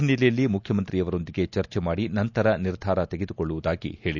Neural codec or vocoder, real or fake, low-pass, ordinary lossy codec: none; real; 7.2 kHz; none